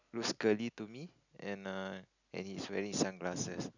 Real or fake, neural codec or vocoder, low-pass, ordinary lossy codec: real; none; 7.2 kHz; none